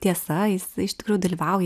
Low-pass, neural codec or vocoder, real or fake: 14.4 kHz; none; real